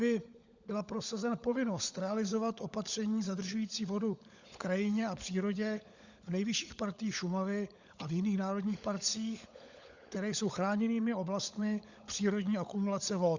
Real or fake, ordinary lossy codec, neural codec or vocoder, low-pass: fake; Opus, 64 kbps; codec, 16 kHz, 16 kbps, FunCodec, trained on LibriTTS, 50 frames a second; 7.2 kHz